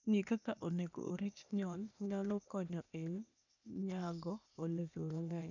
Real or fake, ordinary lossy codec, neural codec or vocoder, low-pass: fake; none; codec, 16 kHz, 0.8 kbps, ZipCodec; 7.2 kHz